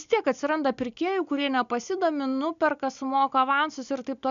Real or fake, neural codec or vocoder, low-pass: real; none; 7.2 kHz